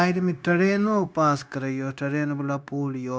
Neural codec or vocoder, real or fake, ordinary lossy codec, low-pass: codec, 16 kHz, 0.9 kbps, LongCat-Audio-Codec; fake; none; none